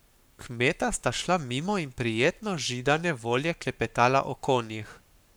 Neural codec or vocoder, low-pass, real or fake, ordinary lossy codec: codec, 44.1 kHz, 7.8 kbps, Pupu-Codec; none; fake; none